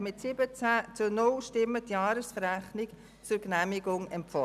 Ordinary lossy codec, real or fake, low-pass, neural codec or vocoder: none; real; 14.4 kHz; none